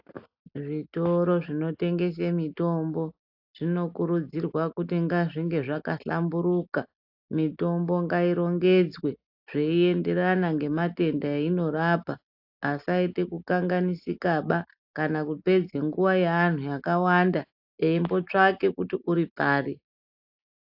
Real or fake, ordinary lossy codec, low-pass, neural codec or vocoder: real; AAC, 48 kbps; 5.4 kHz; none